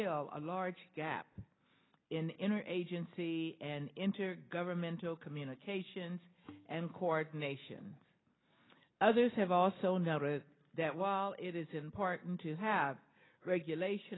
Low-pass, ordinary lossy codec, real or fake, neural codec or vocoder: 7.2 kHz; AAC, 16 kbps; real; none